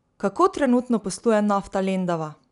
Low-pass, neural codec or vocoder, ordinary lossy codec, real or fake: 10.8 kHz; none; none; real